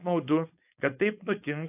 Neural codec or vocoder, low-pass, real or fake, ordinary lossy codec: codec, 16 kHz, 4.8 kbps, FACodec; 3.6 kHz; fake; AAC, 32 kbps